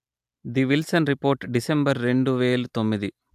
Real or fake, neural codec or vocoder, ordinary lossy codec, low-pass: real; none; AAC, 96 kbps; 14.4 kHz